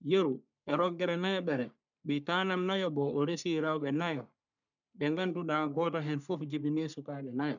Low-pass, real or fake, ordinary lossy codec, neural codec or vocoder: 7.2 kHz; fake; none; codec, 44.1 kHz, 3.4 kbps, Pupu-Codec